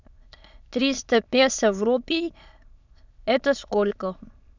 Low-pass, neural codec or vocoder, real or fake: 7.2 kHz; autoencoder, 22.05 kHz, a latent of 192 numbers a frame, VITS, trained on many speakers; fake